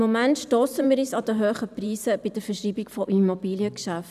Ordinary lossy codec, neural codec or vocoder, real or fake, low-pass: none; vocoder, 44.1 kHz, 128 mel bands every 512 samples, BigVGAN v2; fake; 14.4 kHz